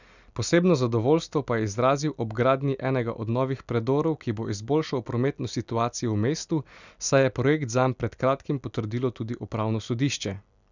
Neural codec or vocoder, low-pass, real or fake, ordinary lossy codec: none; 7.2 kHz; real; none